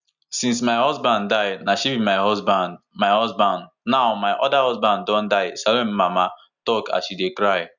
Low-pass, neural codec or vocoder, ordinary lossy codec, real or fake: 7.2 kHz; none; none; real